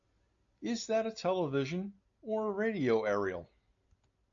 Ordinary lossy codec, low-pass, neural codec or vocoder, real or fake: AAC, 64 kbps; 7.2 kHz; none; real